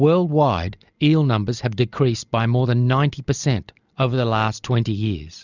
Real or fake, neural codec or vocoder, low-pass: real; none; 7.2 kHz